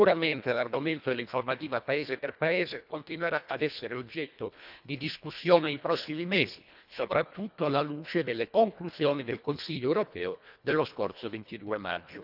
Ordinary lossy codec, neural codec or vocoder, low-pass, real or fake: none; codec, 24 kHz, 1.5 kbps, HILCodec; 5.4 kHz; fake